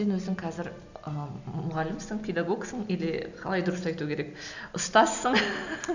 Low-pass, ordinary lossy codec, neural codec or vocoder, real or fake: 7.2 kHz; none; none; real